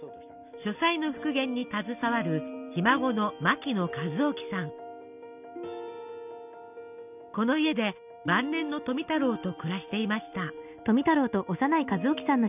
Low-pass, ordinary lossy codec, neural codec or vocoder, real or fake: 3.6 kHz; none; none; real